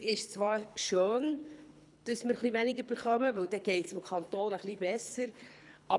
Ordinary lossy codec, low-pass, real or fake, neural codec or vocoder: none; 10.8 kHz; fake; codec, 24 kHz, 3 kbps, HILCodec